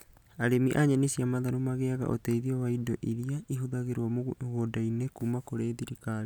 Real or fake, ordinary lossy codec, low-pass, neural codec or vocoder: real; none; none; none